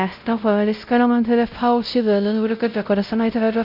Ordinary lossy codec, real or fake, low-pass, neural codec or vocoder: none; fake; 5.4 kHz; codec, 16 kHz, 0.5 kbps, X-Codec, WavLM features, trained on Multilingual LibriSpeech